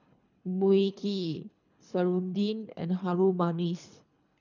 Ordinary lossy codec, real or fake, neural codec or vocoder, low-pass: none; fake; codec, 24 kHz, 3 kbps, HILCodec; 7.2 kHz